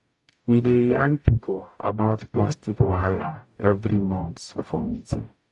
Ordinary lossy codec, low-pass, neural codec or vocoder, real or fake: AAC, 64 kbps; 10.8 kHz; codec, 44.1 kHz, 0.9 kbps, DAC; fake